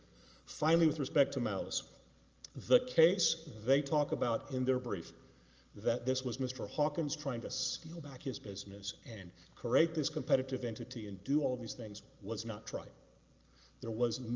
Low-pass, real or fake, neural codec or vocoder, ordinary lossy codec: 7.2 kHz; real; none; Opus, 24 kbps